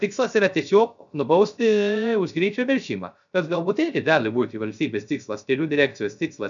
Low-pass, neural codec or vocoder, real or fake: 7.2 kHz; codec, 16 kHz, 0.3 kbps, FocalCodec; fake